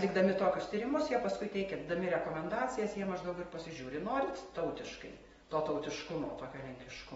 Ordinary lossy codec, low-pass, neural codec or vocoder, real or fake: AAC, 24 kbps; 19.8 kHz; none; real